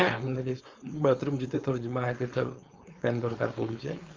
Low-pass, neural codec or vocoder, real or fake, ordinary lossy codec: 7.2 kHz; codec, 16 kHz, 4.8 kbps, FACodec; fake; Opus, 32 kbps